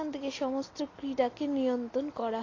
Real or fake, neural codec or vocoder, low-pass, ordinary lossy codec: real; none; 7.2 kHz; AAC, 32 kbps